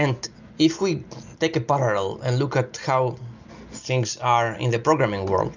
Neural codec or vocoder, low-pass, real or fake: none; 7.2 kHz; real